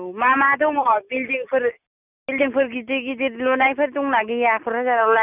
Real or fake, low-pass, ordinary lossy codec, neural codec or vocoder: real; 3.6 kHz; none; none